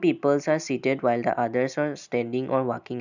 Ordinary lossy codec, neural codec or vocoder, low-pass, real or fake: none; none; 7.2 kHz; real